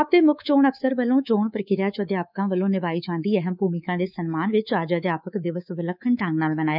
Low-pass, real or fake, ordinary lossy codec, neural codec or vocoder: 5.4 kHz; fake; none; codec, 24 kHz, 3.1 kbps, DualCodec